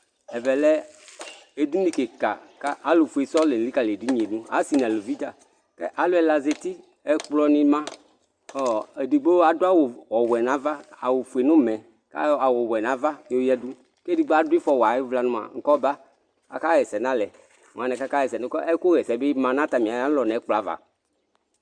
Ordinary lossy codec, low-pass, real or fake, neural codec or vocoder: Opus, 64 kbps; 9.9 kHz; real; none